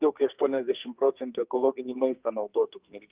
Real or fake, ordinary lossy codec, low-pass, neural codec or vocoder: fake; Opus, 32 kbps; 3.6 kHz; codec, 44.1 kHz, 2.6 kbps, SNAC